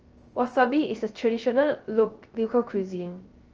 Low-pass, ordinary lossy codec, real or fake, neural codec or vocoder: 7.2 kHz; Opus, 24 kbps; fake; codec, 16 kHz, 0.3 kbps, FocalCodec